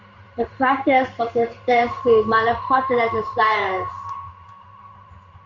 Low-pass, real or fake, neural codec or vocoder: 7.2 kHz; fake; codec, 16 kHz in and 24 kHz out, 1 kbps, XY-Tokenizer